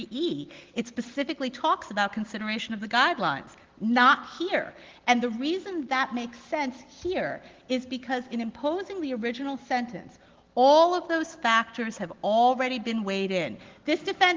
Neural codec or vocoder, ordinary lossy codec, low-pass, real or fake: none; Opus, 16 kbps; 7.2 kHz; real